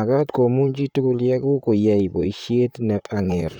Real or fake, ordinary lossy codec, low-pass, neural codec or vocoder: fake; none; 19.8 kHz; vocoder, 44.1 kHz, 128 mel bands, Pupu-Vocoder